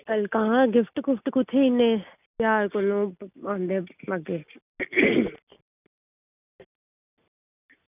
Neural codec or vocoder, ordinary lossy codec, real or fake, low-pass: none; none; real; 3.6 kHz